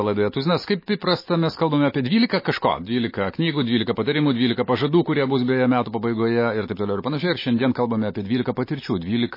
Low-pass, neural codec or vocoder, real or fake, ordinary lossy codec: 5.4 kHz; none; real; MP3, 24 kbps